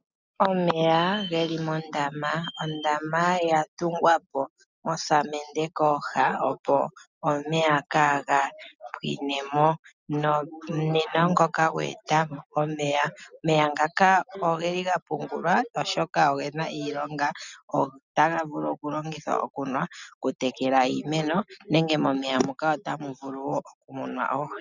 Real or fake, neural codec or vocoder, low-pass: real; none; 7.2 kHz